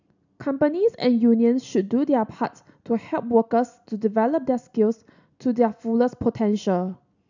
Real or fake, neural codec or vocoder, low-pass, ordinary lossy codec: real; none; 7.2 kHz; none